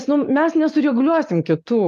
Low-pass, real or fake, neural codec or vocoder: 14.4 kHz; real; none